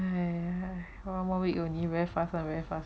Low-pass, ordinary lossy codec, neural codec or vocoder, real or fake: none; none; none; real